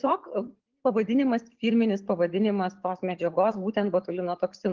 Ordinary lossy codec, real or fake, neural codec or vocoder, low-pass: Opus, 16 kbps; real; none; 7.2 kHz